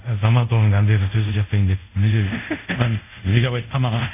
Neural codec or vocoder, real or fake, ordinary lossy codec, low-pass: codec, 24 kHz, 0.5 kbps, DualCodec; fake; none; 3.6 kHz